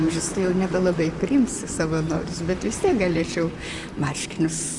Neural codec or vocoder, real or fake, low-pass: vocoder, 44.1 kHz, 128 mel bands, Pupu-Vocoder; fake; 10.8 kHz